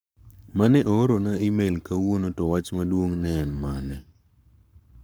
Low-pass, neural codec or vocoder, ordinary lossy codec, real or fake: none; codec, 44.1 kHz, 7.8 kbps, Pupu-Codec; none; fake